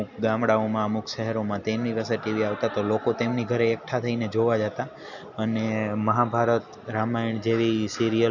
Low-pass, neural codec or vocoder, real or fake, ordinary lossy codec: 7.2 kHz; none; real; none